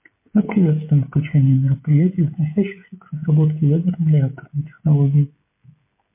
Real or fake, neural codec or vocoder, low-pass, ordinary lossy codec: fake; codec, 16 kHz, 16 kbps, FreqCodec, smaller model; 3.6 kHz; MP3, 24 kbps